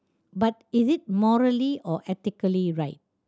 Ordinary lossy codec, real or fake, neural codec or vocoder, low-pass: none; real; none; none